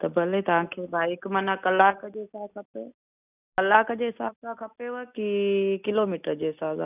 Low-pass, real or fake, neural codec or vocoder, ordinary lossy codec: 3.6 kHz; real; none; none